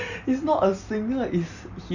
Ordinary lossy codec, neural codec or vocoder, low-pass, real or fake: none; none; 7.2 kHz; real